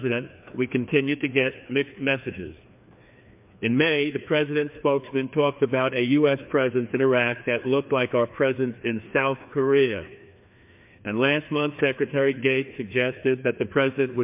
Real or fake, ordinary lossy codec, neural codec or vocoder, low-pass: fake; MP3, 32 kbps; codec, 16 kHz, 2 kbps, FreqCodec, larger model; 3.6 kHz